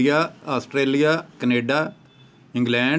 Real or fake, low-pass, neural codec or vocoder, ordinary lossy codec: real; none; none; none